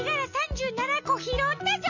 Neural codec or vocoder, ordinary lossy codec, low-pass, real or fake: none; none; 7.2 kHz; real